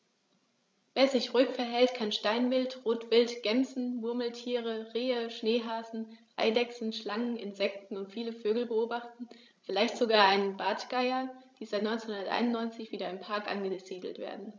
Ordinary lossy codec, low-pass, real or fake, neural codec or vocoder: none; none; fake; codec, 16 kHz, 16 kbps, FreqCodec, larger model